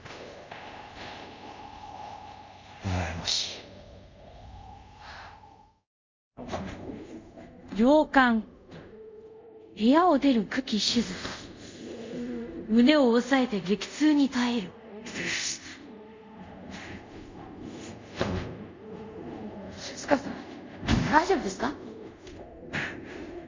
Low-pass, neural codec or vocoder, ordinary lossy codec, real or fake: 7.2 kHz; codec, 24 kHz, 0.5 kbps, DualCodec; MP3, 64 kbps; fake